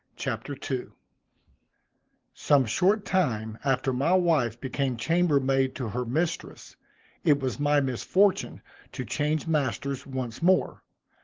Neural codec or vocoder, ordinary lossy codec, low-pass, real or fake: none; Opus, 16 kbps; 7.2 kHz; real